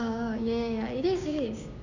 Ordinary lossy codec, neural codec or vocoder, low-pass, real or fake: none; codec, 16 kHz, 6 kbps, DAC; 7.2 kHz; fake